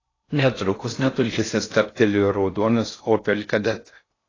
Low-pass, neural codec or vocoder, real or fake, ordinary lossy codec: 7.2 kHz; codec, 16 kHz in and 24 kHz out, 0.6 kbps, FocalCodec, streaming, 4096 codes; fake; AAC, 32 kbps